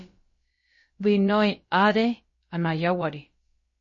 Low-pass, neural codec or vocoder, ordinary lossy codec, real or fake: 7.2 kHz; codec, 16 kHz, about 1 kbps, DyCAST, with the encoder's durations; MP3, 32 kbps; fake